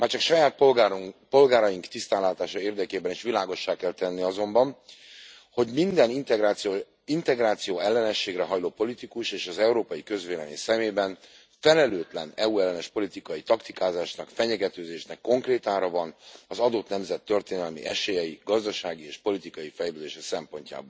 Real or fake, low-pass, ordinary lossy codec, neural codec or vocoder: real; none; none; none